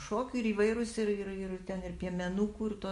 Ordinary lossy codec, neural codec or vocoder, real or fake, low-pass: MP3, 48 kbps; none; real; 14.4 kHz